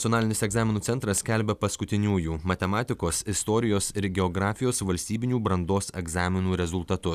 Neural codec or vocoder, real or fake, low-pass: none; real; 14.4 kHz